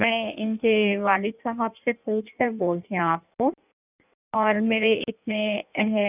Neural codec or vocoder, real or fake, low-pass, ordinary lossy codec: codec, 16 kHz in and 24 kHz out, 1.1 kbps, FireRedTTS-2 codec; fake; 3.6 kHz; none